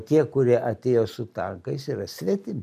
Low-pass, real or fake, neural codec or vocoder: 14.4 kHz; real; none